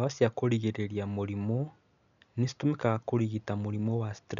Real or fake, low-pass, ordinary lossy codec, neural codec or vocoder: real; 7.2 kHz; none; none